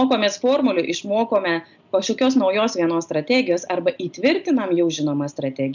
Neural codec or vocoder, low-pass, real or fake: none; 7.2 kHz; real